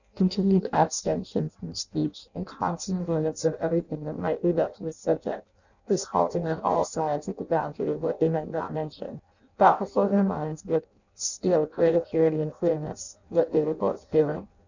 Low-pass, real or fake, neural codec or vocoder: 7.2 kHz; fake; codec, 16 kHz in and 24 kHz out, 0.6 kbps, FireRedTTS-2 codec